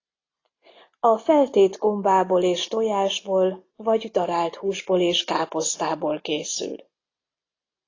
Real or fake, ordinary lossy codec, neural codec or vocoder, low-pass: real; AAC, 32 kbps; none; 7.2 kHz